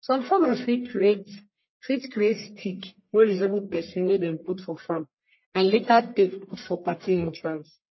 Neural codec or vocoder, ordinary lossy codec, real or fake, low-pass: codec, 44.1 kHz, 1.7 kbps, Pupu-Codec; MP3, 24 kbps; fake; 7.2 kHz